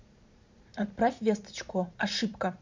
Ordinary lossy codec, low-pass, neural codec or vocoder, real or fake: MP3, 64 kbps; 7.2 kHz; vocoder, 44.1 kHz, 128 mel bands every 512 samples, BigVGAN v2; fake